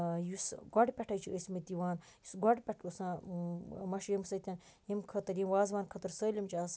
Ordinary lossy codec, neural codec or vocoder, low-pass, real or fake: none; none; none; real